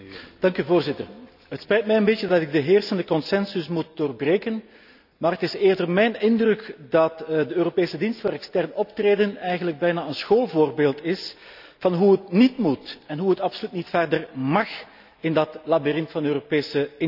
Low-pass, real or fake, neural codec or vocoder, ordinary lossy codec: 5.4 kHz; real; none; none